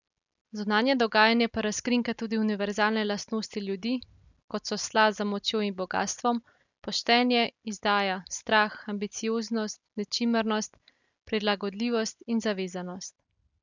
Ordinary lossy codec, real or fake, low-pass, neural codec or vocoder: none; real; 7.2 kHz; none